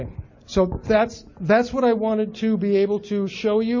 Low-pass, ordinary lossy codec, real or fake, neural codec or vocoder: 7.2 kHz; MP3, 32 kbps; real; none